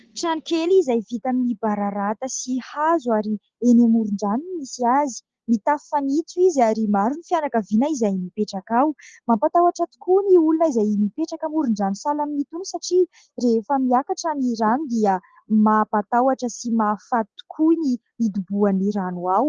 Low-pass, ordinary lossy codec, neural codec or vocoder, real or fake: 7.2 kHz; Opus, 16 kbps; none; real